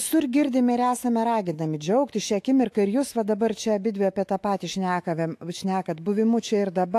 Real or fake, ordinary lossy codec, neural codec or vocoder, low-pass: fake; AAC, 64 kbps; autoencoder, 48 kHz, 128 numbers a frame, DAC-VAE, trained on Japanese speech; 14.4 kHz